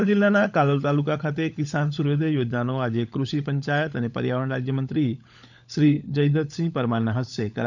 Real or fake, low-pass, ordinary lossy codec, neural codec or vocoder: fake; 7.2 kHz; none; codec, 16 kHz, 16 kbps, FunCodec, trained on LibriTTS, 50 frames a second